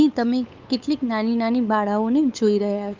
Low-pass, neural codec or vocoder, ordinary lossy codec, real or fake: 7.2 kHz; codec, 16 kHz, 8 kbps, FunCodec, trained on LibriTTS, 25 frames a second; Opus, 24 kbps; fake